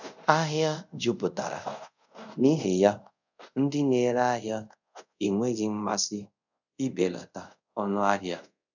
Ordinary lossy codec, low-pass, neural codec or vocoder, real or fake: none; 7.2 kHz; codec, 24 kHz, 0.5 kbps, DualCodec; fake